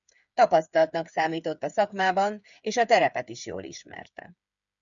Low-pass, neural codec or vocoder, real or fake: 7.2 kHz; codec, 16 kHz, 8 kbps, FreqCodec, smaller model; fake